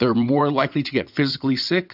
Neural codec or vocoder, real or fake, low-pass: vocoder, 22.05 kHz, 80 mel bands, WaveNeXt; fake; 5.4 kHz